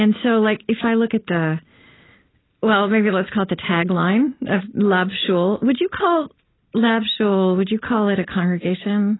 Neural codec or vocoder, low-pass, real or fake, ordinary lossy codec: none; 7.2 kHz; real; AAC, 16 kbps